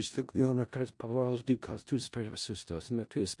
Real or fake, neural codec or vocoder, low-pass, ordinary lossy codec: fake; codec, 16 kHz in and 24 kHz out, 0.4 kbps, LongCat-Audio-Codec, four codebook decoder; 10.8 kHz; MP3, 64 kbps